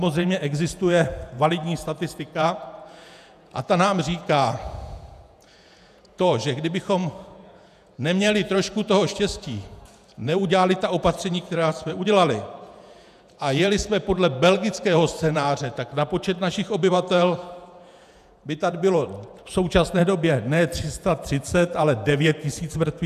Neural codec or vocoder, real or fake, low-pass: vocoder, 48 kHz, 128 mel bands, Vocos; fake; 14.4 kHz